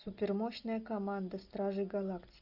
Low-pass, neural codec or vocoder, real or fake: 5.4 kHz; none; real